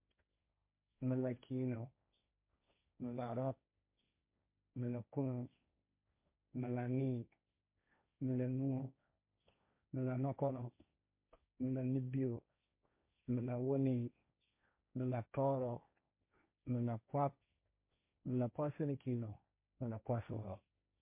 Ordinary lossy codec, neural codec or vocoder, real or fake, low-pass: MP3, 32 kbps; codec, 16 kHz, 1.1 kbps, Voila-Tokenizer; fake; 3.6 kHz